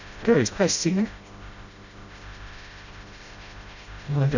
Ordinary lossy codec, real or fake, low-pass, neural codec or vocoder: none; fake; 7.2 kHz; codec, 16 kHz, 0.5 kbps, FreqCodec, smaller model